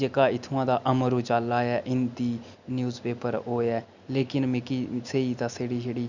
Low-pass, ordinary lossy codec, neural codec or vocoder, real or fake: 7.2 kHz; none; none; real